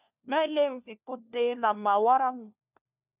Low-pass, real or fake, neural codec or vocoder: 3.6 kHz; fake; codec, 16 kHz, 1 kbps, FunCodec, trained on LibriTTS, 50 frames a second